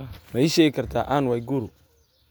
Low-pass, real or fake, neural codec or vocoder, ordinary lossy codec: none; real; none; none